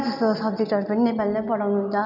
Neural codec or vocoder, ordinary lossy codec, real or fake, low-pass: none; none; real; 5.4 kHz